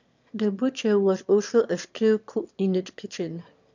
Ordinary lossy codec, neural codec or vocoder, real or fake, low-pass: none; autoencoder, 22.05 kHz, a latent of 192 numbers a frame, VITS, trained on one speaker; fake; 7.2 kHz